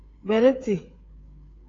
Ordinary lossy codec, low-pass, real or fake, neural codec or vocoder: AAC, 32 kbps; 7.2 kHz; fake; codec, 16 kHz, 8 kbps, FreqCodec, smaller model